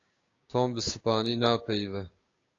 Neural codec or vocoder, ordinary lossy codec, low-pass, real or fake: codec, 16 kHz, 6 kbps, DAC; AAC, 32 kbps; 7.2 kHz; fake